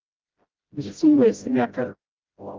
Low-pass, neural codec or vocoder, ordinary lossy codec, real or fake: 7.2 kHz; codec, 16 kHz, 0.5 kbps, FreqCodec, smaller model; Opus, 24 kbps; fake